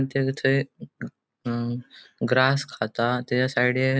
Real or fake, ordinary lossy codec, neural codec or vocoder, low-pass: real; none; none; none